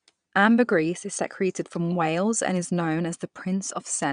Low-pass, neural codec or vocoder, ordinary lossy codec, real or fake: 9.9 kHz; vocoder, 22.05 kHz, 80 mel bands, Vocos; none; fake